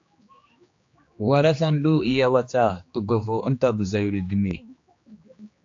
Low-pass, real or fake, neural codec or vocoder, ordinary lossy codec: 7.2 kHz; fake; codec, 16 kHz, 2 kbps, X-Codec, HuBERT features, trained on general audio; AAC, 48 kbps